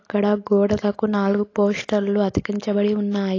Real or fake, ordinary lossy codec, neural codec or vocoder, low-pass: real; AAC, 32 kbps; none; 7.2 kHz